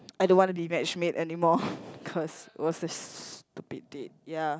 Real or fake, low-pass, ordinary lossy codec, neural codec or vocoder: real; none; none; none